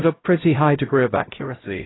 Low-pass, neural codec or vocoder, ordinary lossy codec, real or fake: 7.2 kHz; codec, 16 kHz, 0.5 kbps, X-Codec, HuBERT features, trained on LibriSpeech; AAC, 16 kbps; fake